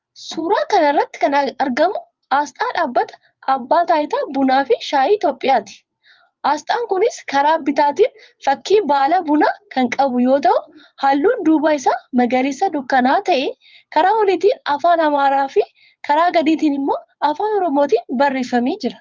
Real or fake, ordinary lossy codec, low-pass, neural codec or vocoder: fake; Opus, 24 kbps; 7.2 kHz; vocoder, 22.05 kHz, 80 mel bands, WaveNeXt